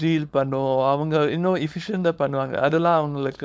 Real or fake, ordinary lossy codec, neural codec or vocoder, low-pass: fake; none; codec, 16 kHz, 4.8 kbps, FACodec; none